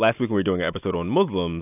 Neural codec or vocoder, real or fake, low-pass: none; real; 3.6 kHz